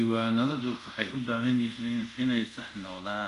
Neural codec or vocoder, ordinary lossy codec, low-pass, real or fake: codec, 24 kHz, 0.5 kbps, DualCodec; MP3, 64 kbps; 10.8 kHz; fake